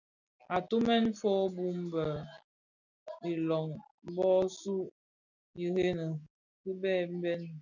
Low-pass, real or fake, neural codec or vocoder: 7.2 kHz; real; none